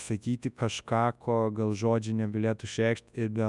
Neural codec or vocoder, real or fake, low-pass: codec, 24 kHz, 0.9 kbps, WavTokenizer, large speech release; fake; 10.8 kHz